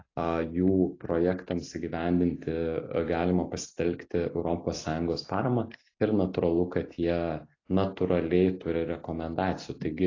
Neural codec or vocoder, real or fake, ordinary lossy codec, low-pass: none; real; AAC, 32 kbps; 7.2 kHz